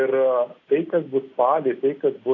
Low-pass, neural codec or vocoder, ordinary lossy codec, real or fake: 7.2 kHz; none; AAC, 32 kbps; real